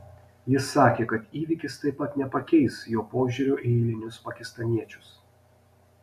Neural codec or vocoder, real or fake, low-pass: none; real; 14.4 kHz